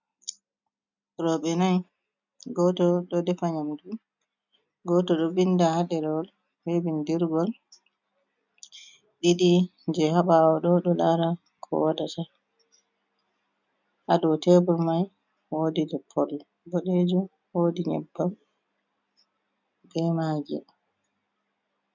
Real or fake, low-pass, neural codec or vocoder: real; 7.2 kHz; none